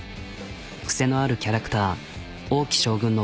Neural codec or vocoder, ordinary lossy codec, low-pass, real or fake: none; none; none; real